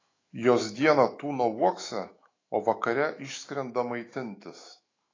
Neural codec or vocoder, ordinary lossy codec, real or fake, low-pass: autoencoder, 48 kHz, 128 numbers a frame, DAC-VAE, trained on Japanese speech; AAC, 32 kbps; fake; 7.2 kHz